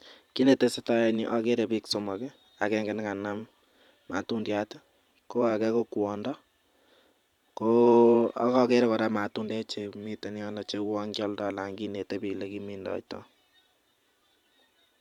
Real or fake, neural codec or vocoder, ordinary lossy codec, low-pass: fake; vocoder, 44.1 kHz, 128 mel bands every 512 samples, BigVGAN v2; none; 19.8 kHz